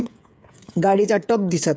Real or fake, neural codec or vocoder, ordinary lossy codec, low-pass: fake; codec, 16 kHz, 8 kbps, FreqCodec, smaller model; none; none